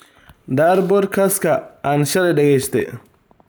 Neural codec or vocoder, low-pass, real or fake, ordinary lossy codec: vocoder, 44.1 kHz, 128 mel bands every 512 samples, BigVGAN v2; none; fake; none